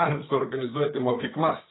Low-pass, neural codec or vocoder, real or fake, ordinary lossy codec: 7.2 kHz; codec, 16 kHz, 4 kbps, FreqCodec, larger model; fake; AAC, 16 kbps